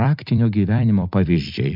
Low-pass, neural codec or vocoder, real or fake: 5.4 kHz; vocoder, 44.1 kHz, 128 mel bands every 256 samples, BigVGAN v2; fake